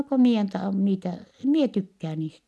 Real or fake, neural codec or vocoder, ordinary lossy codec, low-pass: real; none; none; none